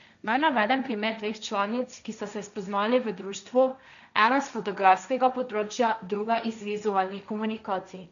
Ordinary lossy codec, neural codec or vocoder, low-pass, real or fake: none; codec, 16 kHz, 1.1 kbps, Voila-Tokenizer; 7.2 kHz; fake